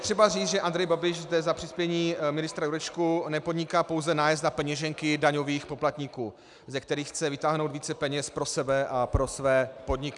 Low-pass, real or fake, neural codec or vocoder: 10.8 kHz; real; none